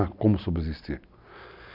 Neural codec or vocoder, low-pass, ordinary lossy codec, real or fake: none; 5.4 kHz; none; real